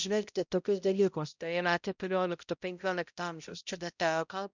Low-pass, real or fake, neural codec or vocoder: 7.2 kHz; fake; codec, 16 kHz, 0.5 kbps, X-Codec, HuBERT features, trained on balanced general audio